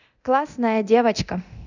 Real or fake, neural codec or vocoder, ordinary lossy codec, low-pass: fake; codec, 24 kHz, 0.9 kbps, DualCodec; none; 7.2 kHz